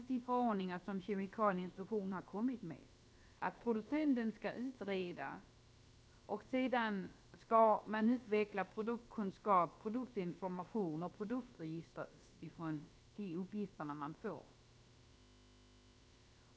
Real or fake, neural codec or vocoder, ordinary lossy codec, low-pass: fake; codec, 16 kHz, about 1 kbps, DyCAST, with the encoder's durations; none; none